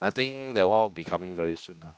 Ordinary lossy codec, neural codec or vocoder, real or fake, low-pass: none; codec, 16 kHz, 1 kbps, X-Codec, HuBERT features, trained on general audio; fake; none